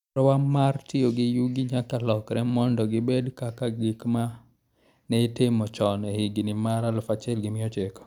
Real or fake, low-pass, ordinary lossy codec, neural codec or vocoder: real; 19.8 kHz; none; none